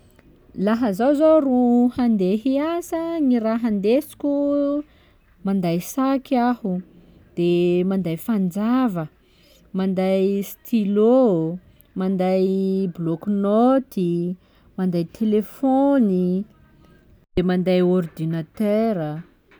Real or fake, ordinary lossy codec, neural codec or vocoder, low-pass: real; none; none; none